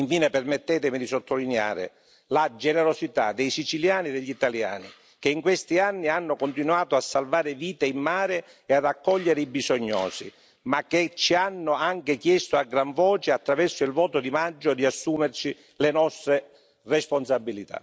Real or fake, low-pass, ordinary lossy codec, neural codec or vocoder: real; none; none; none